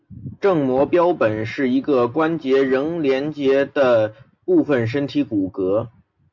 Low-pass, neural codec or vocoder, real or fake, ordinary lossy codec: 7.2 kHz; none; real; MP3, 48 kbps